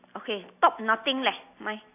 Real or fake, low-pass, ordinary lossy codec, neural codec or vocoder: real; 3.6 kHz; AAC, 32 kbps; none